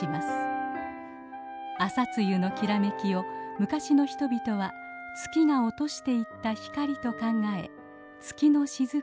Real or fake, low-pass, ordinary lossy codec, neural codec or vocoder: real; none; none; none